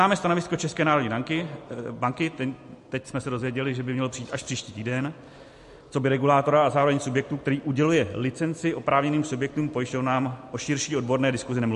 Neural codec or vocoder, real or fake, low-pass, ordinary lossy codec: none; real; 14.4 kHz; MP3, 48 kbps